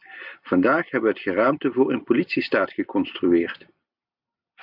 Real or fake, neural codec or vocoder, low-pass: real; none; 5.4 kHz